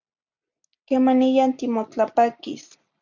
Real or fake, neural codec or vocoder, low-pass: real; none; 7.2 kHz